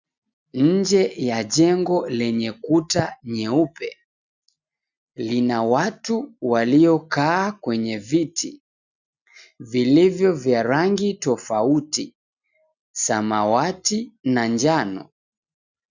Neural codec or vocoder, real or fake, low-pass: none; real; 7.2 kHz